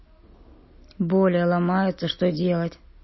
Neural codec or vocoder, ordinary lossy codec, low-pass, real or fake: none; MP3, 24 kbps; 7.2 kHz; real